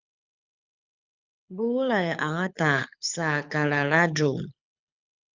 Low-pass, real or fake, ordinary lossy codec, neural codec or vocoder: 7.2 kHz; fake; Opus, 64 kbps; codec, 24 kHz, 6 kbps, HILCodec